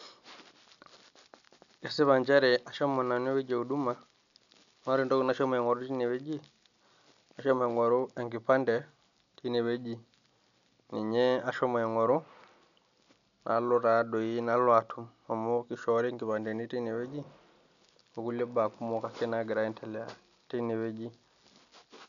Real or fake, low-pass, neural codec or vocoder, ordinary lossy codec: real; 7.2 kHz; none; none